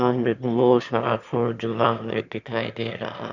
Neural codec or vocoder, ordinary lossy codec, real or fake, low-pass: autoencoder, 22.05 kHz, a latent of 192 numbers a frame, VITS, trained on one speaker; none; fake; 7.2 kHz